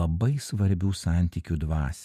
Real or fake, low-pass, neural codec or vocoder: fake; 14.4 kHz; vocoder, 48 kHz, 128 mel bands, Vocos